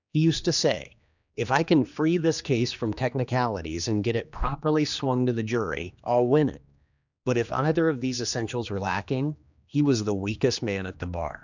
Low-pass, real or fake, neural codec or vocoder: 7.2 kHz; fake; codec, 16 kHz, 2 kbps, X-Codec, HuBERT features, trained on general audio